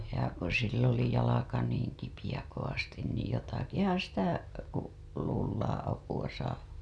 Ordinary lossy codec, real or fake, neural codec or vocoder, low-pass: none; real; none; none